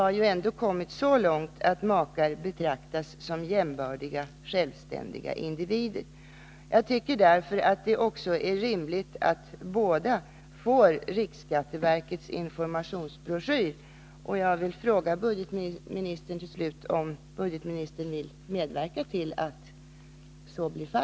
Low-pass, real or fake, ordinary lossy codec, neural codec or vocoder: none; real; none; none